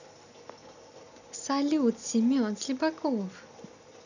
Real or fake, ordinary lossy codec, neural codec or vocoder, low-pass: real; none; none; 7.2 kHz